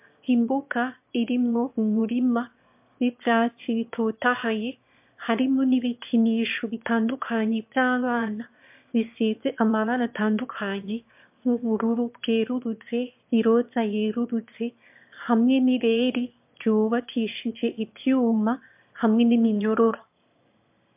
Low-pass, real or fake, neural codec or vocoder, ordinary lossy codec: 3.6 kHz; fake; autoencoder, 22.05 kHz, a latent of 192 numbers a frame, VITS, trained on one speaker; MP3, 32 kbps